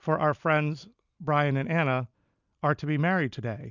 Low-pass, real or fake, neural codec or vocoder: 7.2 kHz; real; none